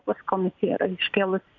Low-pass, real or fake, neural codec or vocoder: 7.2 kHz; real; none